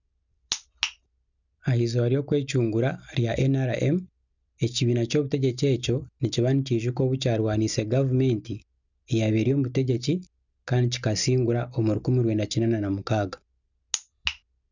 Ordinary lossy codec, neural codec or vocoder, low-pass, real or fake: none; none; 7.2 kHz; real